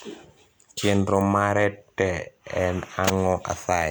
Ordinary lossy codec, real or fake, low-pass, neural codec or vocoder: none; real; none; none